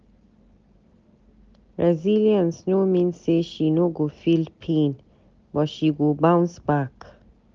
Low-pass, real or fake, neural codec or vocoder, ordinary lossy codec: 7.2 kHz; real; none; Opus, 24 kbps